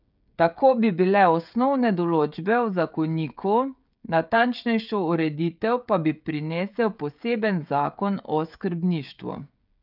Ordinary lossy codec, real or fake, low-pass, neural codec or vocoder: none; fake; 5.4 kHz; codec, 16 kHz, 16 kbps, FreqCodec, smaller model